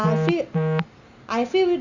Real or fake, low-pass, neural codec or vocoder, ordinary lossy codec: real; 7.2 kHz; none; Opus, 64 kbps